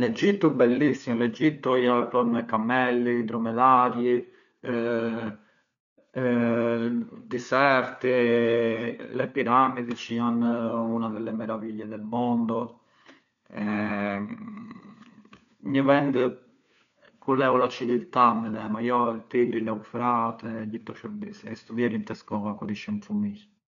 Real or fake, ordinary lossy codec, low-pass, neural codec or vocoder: fake; none; 7.2 kHz; codec, 16 kHz, 4 kbps, FunCodec, trained on LibriTTS, 50 frames a second